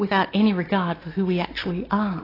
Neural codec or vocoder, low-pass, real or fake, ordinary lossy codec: none; 5.4 kHz; real; AAC, 24 kbps